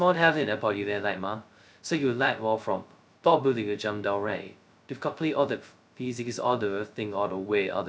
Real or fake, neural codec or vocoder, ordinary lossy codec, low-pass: fake; codec, 16 kHz, 0.2 kbps, FocalCodec; none; none